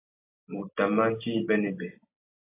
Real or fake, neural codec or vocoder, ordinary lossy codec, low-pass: real; none; AAC, 24 kbps; 3.6 kHz